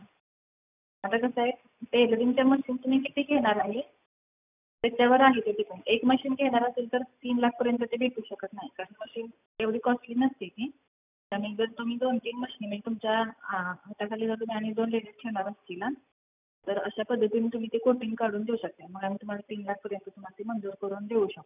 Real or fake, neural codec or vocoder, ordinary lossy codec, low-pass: real; none; none; 3.6 kHz